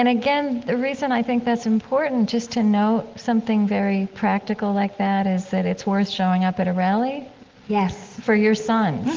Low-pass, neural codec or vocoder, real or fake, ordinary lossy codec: 7.2 kHz; none; real; Opus, 16 kbps